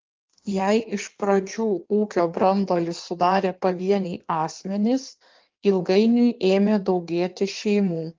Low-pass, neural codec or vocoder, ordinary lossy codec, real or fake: 7.2 kHz; codec, 16 kHz in and 24 kHz out, 1.1 kbps, FireRedTTS-2 codec; Opus, 32 kbps; fake